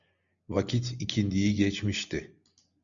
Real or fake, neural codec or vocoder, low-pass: real; none; 7.2 kHz